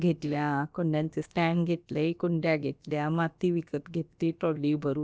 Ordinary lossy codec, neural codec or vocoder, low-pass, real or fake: none; codec, 16 kHz, about 1 kbps, DyCAST, with the encoder's durations; none; fake